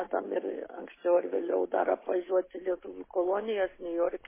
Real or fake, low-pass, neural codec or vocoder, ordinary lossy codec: real; 3.6 kHz; none; MP3, 16 kbps